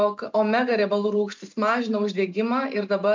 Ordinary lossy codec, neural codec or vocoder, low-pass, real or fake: MP3, 64 kbps; none; 7.2 kHz; real